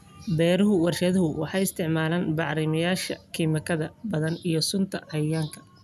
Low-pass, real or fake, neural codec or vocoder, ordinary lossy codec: 14.4 kHz; real; none; none